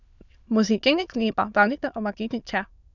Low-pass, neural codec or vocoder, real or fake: 7.2 kHz; autoencoder, 22.05 kHz, a latent of 192 numbers a frame, VITS, trained on many speakers; fake